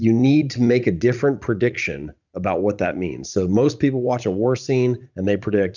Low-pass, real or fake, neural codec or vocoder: 7.2 kHz; fake; vocoder, 44.1 kHz, 80 mel bands, Vocos